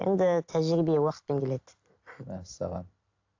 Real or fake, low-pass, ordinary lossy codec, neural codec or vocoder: fake; 7.2 kHz; AAC, 48 kbps; vocoder, 44.1 kHz, 128 mel bands every 256 samples, BigVGAN v2